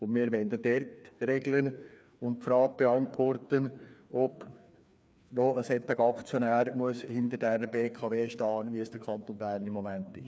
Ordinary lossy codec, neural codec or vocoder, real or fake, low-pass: none; codec, 16 kHz, 2 kbps, FreqCodec, larger model; fake; none